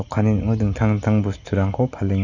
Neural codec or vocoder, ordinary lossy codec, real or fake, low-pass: none; none; real; 7.2 kHz